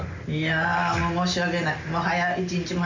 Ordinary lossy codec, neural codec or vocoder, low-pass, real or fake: MP3, 64 kbps; none; 7.2 kHz; real